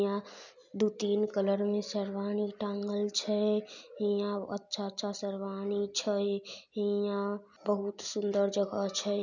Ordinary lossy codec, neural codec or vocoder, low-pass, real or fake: none; none; 7.2 kHz; real